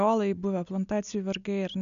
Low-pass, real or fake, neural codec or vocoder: 7.2 kHz; real; none